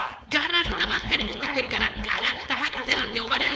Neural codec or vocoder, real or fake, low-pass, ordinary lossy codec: codec, 16 kHz, 4.8 kbps, FACodec; fake; none; none